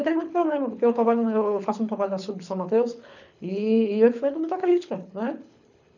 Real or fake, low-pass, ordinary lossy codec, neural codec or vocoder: fake; 7.2 kHz; none; codec, 24 kHz, 6 kbps, HILCodec